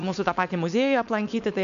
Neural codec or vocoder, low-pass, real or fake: codec, 16 kHz, 4.8 kbps, FACodec; 7.2 kHz; fake